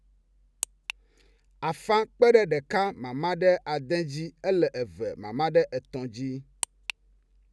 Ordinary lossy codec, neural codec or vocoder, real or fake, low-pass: none; none; real; none